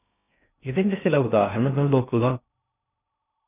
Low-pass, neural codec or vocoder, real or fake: 3.6 kHz; codec, 16 kHz in and 24 kHz out, 0.6 kbps, FocalCodec, streaming, 2048 codes; fake